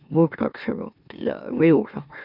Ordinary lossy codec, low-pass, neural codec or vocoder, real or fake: none; 5.4 kHz; autoencoder, 44.1 kHz, a latent of 192 numbers a frame, MeloTTS; fake